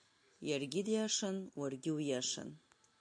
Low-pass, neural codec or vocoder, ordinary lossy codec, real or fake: 9.9 kHz; none; AAC, 64 kbps; real